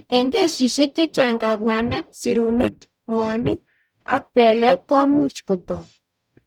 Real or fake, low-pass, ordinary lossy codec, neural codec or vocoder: fake; 19.8 kHz; none; codec, 44.1 kHz, 0.9 kbps, DAC